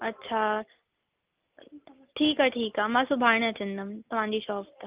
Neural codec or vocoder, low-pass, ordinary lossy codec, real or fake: none; 3.6 kHz; Opus, 24 kbps; real